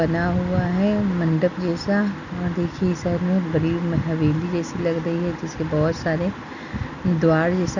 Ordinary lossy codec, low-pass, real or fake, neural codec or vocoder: none; 7.2 kHz; real; none